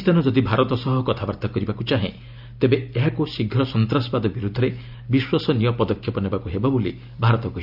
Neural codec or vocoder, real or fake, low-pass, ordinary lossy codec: none; real; 5.4 kHz; AAC, 48 kbps